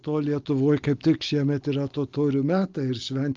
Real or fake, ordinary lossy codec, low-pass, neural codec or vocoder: real; Opus, 24 kbps; 7.2 kHz; none